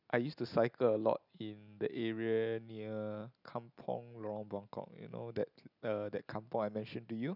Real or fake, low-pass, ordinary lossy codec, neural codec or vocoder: real; 5.4 kHz; none; none